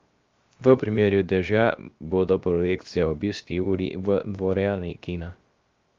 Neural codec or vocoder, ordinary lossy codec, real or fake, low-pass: codec, 16 kHz, 0.7 kbps, FocalCodec; Opus, 32 kbps; fake; 7.2 kHz